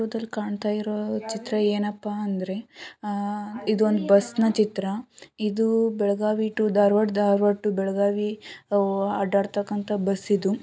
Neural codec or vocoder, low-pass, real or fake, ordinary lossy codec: none; none; real; none